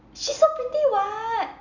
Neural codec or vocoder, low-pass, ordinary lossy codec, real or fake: none; 7.2 kHz; none; real